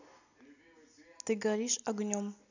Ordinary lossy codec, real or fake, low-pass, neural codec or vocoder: none; real; 7.2 kHz; none